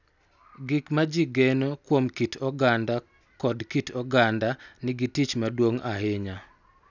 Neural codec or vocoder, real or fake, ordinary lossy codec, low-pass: none; real; none; 7.2 kHz